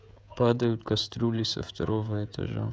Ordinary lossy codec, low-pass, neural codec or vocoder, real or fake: none; none; codec, 16 kHz, 16 kbps, FreqCodec, smaller model; fake